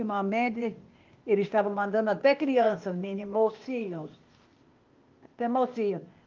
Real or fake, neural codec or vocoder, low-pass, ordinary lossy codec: fake; codec, 16 kHz, 0.8 kbps, ZipCodec; 7.2 kHz; Opus, 32 kbps